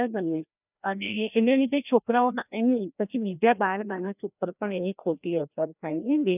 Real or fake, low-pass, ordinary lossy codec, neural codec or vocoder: fake; 3.6 kHz; none; codec, 16 kHz, 1 kbps, FreqCodec, larger model